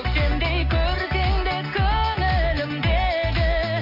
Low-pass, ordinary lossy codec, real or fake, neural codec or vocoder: 5.4 kHz; AAC, 32 kbps; real; none